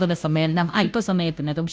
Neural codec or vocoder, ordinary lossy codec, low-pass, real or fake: codec, 16 kHz, 0.5 kbps, FunCodec, trained on Chinese and English, 25 frames a second; none; none; fake